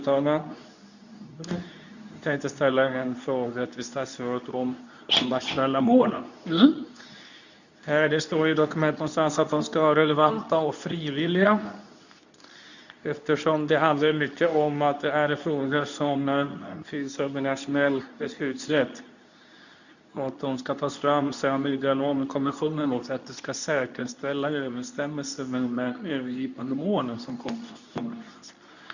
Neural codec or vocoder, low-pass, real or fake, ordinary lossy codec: codec, 24 kHz, 0.9 kbps, WavTokenizer, medium speech release version 2; 7.2 kHz; fake; none